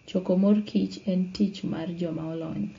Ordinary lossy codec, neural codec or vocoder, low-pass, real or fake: AAC, 32 kbps; none; 7.2 kHz; real